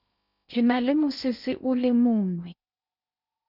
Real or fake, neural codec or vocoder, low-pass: fake; codec, 16 kHz in and 24 kHz out, 0.6 kbps, FocalCodec, streaming, 2048 codes; 5.4 kHz